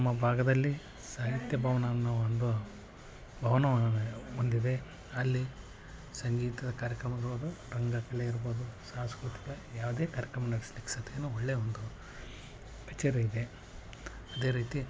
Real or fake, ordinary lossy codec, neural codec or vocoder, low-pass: real; none; none; none